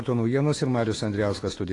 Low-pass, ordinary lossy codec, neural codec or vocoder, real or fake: 10.8 kHz; AAC, 32 kbps; autoencoder, 48 kHz, 128 numbers a frame, DAC-VAE, trained on Japanese speech; fake